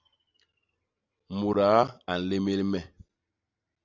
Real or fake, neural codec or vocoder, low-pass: real; none; 7.2 kHz